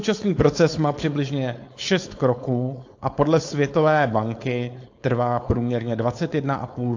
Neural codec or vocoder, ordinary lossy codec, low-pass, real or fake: codec, 16 kHz, 4.8 kbps, FACodec; AAC, 48 kbps; 7.2 kHz; fake